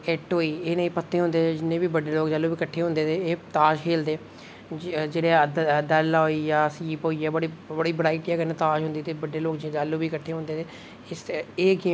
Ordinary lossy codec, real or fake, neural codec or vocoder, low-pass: none; real; none; none